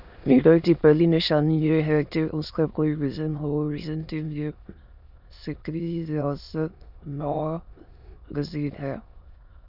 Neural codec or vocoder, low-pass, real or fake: autoencoder, 22.05 kHz, a latent of 192 numbers a frame, VITS, trained on many speakers; 5.4 kHz; fake